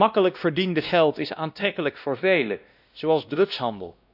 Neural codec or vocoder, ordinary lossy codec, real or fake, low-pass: codec, 16 kHz, 1 kbps, X-Codec, WavLM features, trained on Multilingual LibriSpeech; none; fake; 5.4 kHz